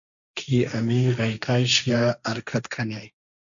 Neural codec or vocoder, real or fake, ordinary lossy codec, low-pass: codec, 16 kHz, 1.1 kbps, Voila-Tokenizer; fake; MP3, 96 kbps; 7.2 kHz